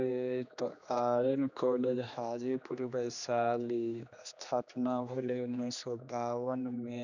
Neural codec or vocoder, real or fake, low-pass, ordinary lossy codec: codec, 16 kHz, 2 kbps, X-Codec, HuBERT features, trained on general audio; fake; 7.2 kHz; none